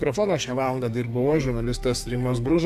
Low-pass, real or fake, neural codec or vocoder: 14.4 kHz; fake; codec, 32 kHz, 1.9 kbps, SNAC